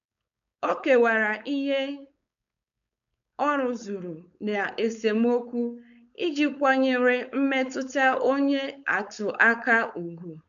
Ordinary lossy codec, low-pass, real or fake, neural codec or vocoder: none; 7.2 kHz; fake; codec, 16 kHz, 4.8 kbps, FACodec